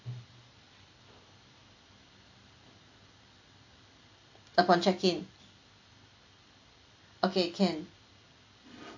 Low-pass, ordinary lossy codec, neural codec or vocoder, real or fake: 7.2 kHz; AAC, 48 kbps; none; real